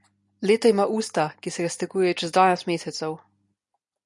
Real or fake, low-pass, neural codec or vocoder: real; 10.8 kHz; none